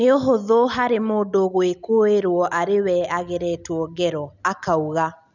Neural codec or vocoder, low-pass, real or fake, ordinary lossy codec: none; 7.2 kHz; real; none